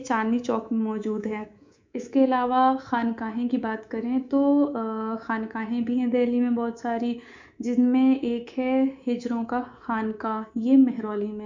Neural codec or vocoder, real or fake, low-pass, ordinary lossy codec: codec, 24 kHz, 3.1 kbps, DualCodec; fake; 7.2 kHz; MP3, 64 kbps